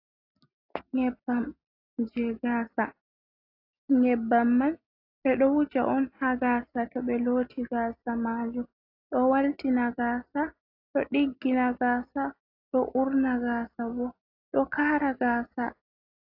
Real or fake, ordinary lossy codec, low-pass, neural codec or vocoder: real; AAC, 32 kbps; 5.4 kHz; none